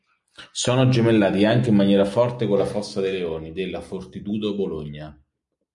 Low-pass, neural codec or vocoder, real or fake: 10.8 kHz; none; real